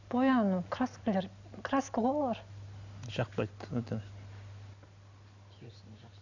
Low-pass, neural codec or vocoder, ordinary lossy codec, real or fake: 7.2 kHz; none; none; real